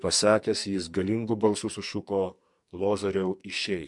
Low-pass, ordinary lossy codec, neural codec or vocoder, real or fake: 10.8 kHz; MP3, 64 kbps; codec, 44.1 kHz, 2.6 kbps, SNAC; fake